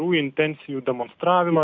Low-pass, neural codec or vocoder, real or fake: 7.2 kHz; none; real